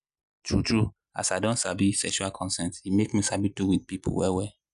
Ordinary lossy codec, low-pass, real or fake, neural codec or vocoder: none; 10.8 kHz; real; none